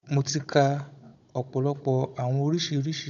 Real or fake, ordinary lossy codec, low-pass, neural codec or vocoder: fake; none; 7.2 kHz; codec, 16 kHz, 16 kbps, FunCodec, trained on Chinese and English, 50 frames a second